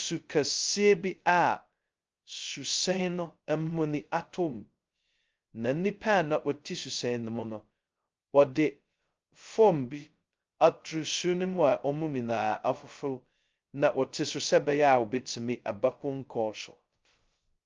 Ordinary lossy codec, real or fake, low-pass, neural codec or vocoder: Opus, 24 kbps; fake; 7.2 kHz; codec, 16 kHz, 0.2 kbps, FocalCodec